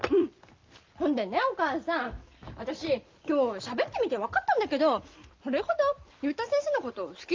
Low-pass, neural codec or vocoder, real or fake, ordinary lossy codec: 7.2 kHz; none; real; Opus, 24 kbps